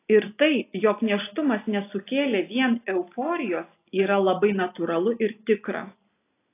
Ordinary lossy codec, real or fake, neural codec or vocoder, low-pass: AAC, 24 kbps; real; none; 3.6 kHz